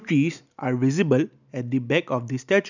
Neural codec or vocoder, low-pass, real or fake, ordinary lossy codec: none; 7.2 kHz; real; none